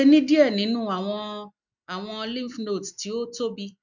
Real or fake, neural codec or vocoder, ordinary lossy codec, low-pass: real; none; none; 7.2 kHz